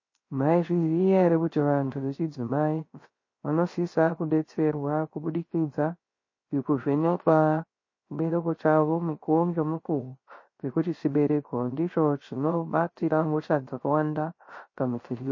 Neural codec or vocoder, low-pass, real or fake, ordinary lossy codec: codec, 16 kHz, 0.3 kbps, FocalCodec; 7.2 kHz; fake; MP3, 32 kbps